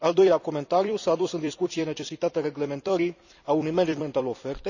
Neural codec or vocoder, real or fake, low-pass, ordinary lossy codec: vocoder, 44.1 kHz, 128 mel bands every 256 samples, BigVGAN v2; fake; 7.2 kHz; none